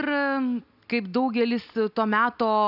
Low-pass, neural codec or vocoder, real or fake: 5.4 kHz; none; real